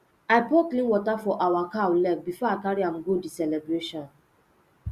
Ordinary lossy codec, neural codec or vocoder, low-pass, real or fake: Opus, 64 kbps; none; 14.4 kHz; real